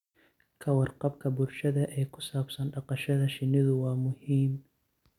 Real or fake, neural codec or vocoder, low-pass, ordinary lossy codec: real; none; 19.8 kHz; none